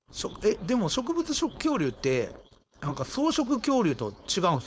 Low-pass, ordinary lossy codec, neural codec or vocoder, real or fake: none; none; codec, 16 kHz, 4.8 kbps, FACodec; fake